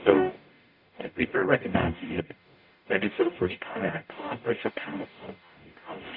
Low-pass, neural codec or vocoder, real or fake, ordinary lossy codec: 5.4 kHz; codec, 44.1 kHz, 0.9 kbps, DAC; fake; MP3, 48 kbps